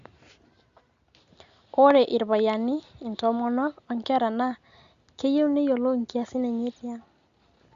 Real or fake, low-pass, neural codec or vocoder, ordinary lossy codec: real; 7.2 kHz; none; Opus, 64 kbps